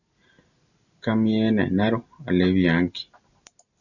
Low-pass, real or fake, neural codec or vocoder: 7.2 kHz; real; none